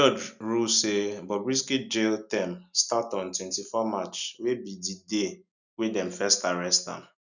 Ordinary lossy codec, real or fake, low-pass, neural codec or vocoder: none; real; 7.2 kHz; none